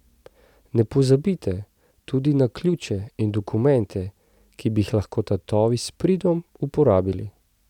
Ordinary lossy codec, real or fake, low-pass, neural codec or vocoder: none; real; 19.8 kHz; none